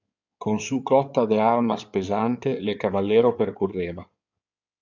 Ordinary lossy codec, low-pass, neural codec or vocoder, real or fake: MP3, 64 kbps; 7.2 kHz; codec, 16 kHz in and 24 kHz out, 2.2 kbps, FireRedTTS-2 codec; fake